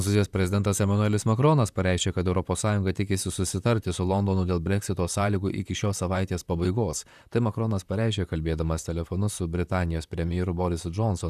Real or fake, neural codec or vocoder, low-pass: fake; vocoder, 44.1 kHz, 128 mel bands, Pupu-Vocoder; 14.4 kHz